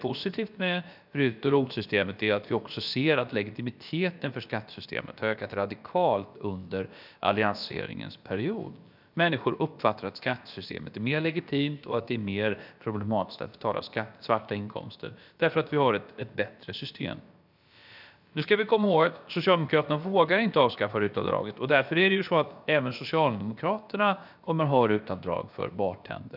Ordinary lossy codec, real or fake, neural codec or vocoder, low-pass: none; fake; codec, 16 kHz, about 1 kbps, DyCAST, with the encoder's durations; 5.4 kHz